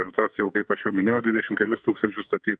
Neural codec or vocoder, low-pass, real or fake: codec, 44.1 kHz, 2.6 kbps, SNAC; 9.9 kHz; fake